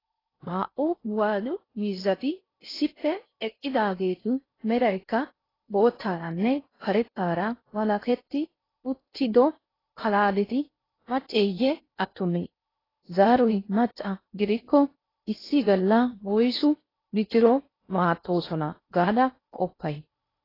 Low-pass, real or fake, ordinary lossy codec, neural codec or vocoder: 5.4 kHz; fake; AAC, 24 kbps; codec, 16 kHz in and 24 kHz out, 0.6 kbps, FocalCodec, streaming, 4096 codes